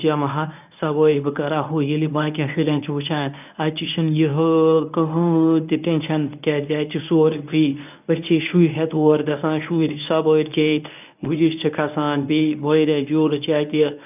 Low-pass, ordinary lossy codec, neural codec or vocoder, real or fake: 3.6 kHz; none; codec, 24 kHz, 0.9 kbps, WavTokenizer, medium speech release version 1; fake